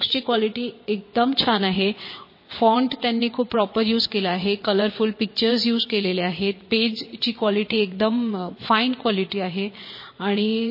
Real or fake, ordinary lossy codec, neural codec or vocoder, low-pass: fake; MP3, 24 kbps; vocoder, 22.05 kHz, 80 mel bands, WaveNeXt; 5.4 kHz